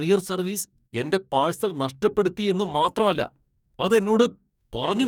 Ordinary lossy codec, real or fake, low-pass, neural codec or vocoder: none; fake; 19.8 kHz; codec, 44.1 kHz, 2.6 kbps, DAC